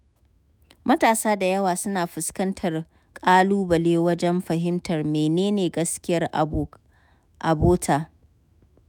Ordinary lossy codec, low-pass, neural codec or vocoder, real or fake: none; none; autoencoder, 48 kHz, 128 numbers a frame, DAC-VAE, trained on Japanese speech; fake